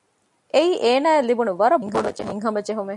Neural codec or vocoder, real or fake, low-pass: none; real; 10.8 kHz